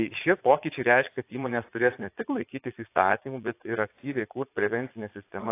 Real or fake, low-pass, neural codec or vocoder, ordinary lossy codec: fake; 3.6 kHz; vocoder, 22.05 kHz, 80 mel bands, Vocos; AAC, 24 kbps